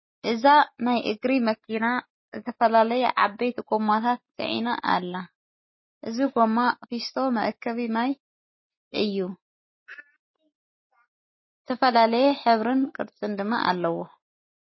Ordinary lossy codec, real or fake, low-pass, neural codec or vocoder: MP3, 24 kbps; real; 7.2 kHz; none